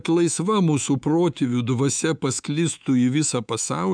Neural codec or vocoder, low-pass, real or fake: codec, 24 kHz, 3.1 kbps, DualCodec; 9.9 kHz; fake